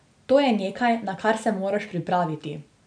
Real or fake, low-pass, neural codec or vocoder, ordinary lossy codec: real; 9.9 kHz; none; none